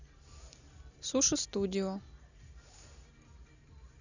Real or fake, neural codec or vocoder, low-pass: real; none; 7.2 kHz